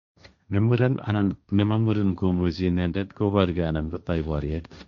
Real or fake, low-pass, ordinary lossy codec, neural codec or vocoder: fake; 7.2 kHz; none; codec, 16 kHz, 1.1 kbps, Voila-Tokenizer